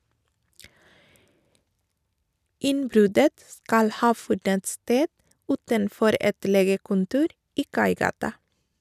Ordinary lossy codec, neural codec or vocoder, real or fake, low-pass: none; none; real; 14.4 kHz